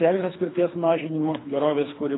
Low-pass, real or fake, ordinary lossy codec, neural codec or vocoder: 7.2 kHz; fake; AAC, 16 kbps; codec, 24 kHz, 3 kbps, HILCodec